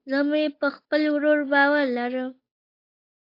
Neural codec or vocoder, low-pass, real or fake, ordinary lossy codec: codec, 16 kHz, 8 kbps, FunCodec, trained on Chinese and English, 25 frames a second; 5.4 kHz; fake; AAC, 32 kbps